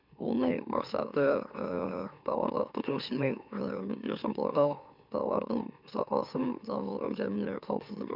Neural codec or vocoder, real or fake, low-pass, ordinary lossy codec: autoencoder, 44.1 kHz, a latent of 192 numbers a frame, MeloTTS; fake; 5.4 kHz; none